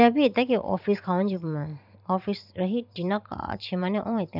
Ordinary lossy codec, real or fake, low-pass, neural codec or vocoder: none; fake; 5.4 kHz; vocoder, 44.1 kHz, 128 mel bands every 512 samples, BigVGAN v2